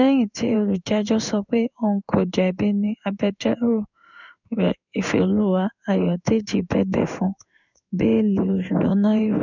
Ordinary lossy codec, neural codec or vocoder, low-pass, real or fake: none; codec, 16 kHz in and 24 kHz out, 1 kbps, XY-Tokenizer; 7.2 kHz; fake